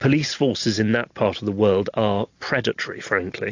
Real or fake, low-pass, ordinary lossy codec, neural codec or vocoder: real; 7.2 kHz; AAC, 48 kbps; none